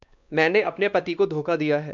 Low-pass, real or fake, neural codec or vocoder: 7.2 kHz; fake; codec, 16 kHz, 2 kbps, X-Codec, WavLM features, trained on Multilingual LibriSpeech